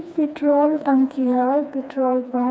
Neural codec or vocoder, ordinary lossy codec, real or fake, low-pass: codec, 16 kHz, 2 kbps, FreqCodec, smaller model; none; fake; none